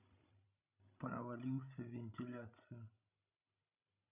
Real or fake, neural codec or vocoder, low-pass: fake; codec, 16 kHz, 16 kbps, FreqCodec, larger model; 3.6 kHz